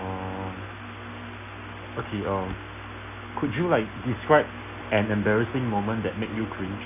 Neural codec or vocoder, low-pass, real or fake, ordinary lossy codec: none; 3.6 kHz; real; none